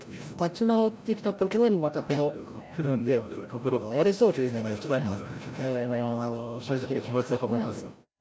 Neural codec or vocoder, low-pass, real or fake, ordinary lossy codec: codec, 16 kHz, 0.5 kbps, FreqCodec, larger model; none; fake; none